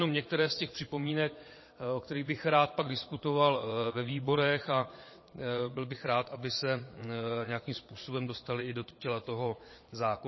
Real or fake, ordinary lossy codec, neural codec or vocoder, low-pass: fake; MP3, 24 kbps; vocoder, 22.05 kHz, 80 mel bands, Vocos; 7.2 kHz